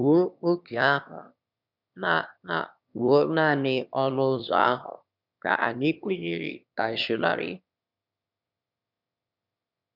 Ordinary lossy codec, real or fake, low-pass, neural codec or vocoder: none; fake; 5.4 kHz; autoencoder, 22.05 kHz, a latent of 192 numbers a frame, VITS, trained on one speaker